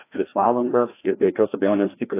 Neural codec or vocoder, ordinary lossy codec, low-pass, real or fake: codec, 16 kHz, 1 kbps, FreqCodec, larger model; AAC, 24 kbps; 3.6 kHz; fake